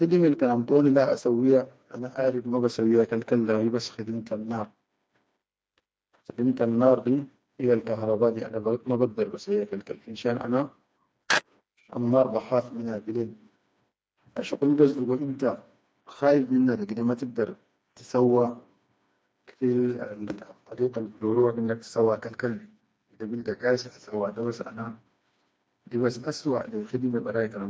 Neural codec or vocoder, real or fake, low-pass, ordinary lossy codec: codec, 16 kHz, 2 kbps, FreqCodec, smaller model; fake; none; none